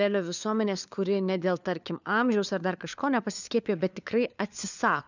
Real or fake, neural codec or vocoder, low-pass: fake; codec, 16 kHz, 4 kbps, FunCodec, trained on LibriTTS, 50 frames a second; 7.2 kHz